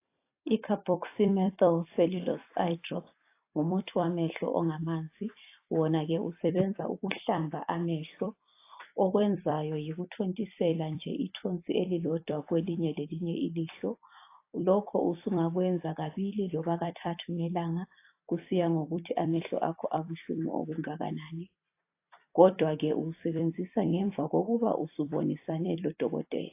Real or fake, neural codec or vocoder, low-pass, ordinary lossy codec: fake; vocoder, 44.1 kHz, 128 mel bands every 256 samples, BigVGAN v2; 3.6 kHz; AAC, 24 kbps